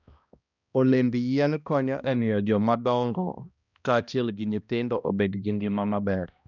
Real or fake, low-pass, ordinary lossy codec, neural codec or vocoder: fake; 7.2 kHz; none; codec, 16 kHz, 1 kbps, X-Codec, HuBERT features, trained on balanced general audio